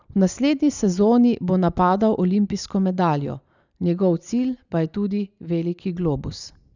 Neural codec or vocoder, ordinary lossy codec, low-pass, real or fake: none; none; 7.2 kHz; real